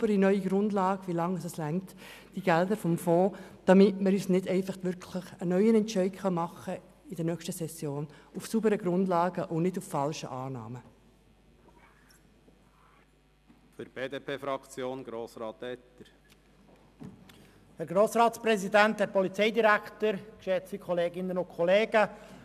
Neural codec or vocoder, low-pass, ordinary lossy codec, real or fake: none; 14.4 kHz; none; real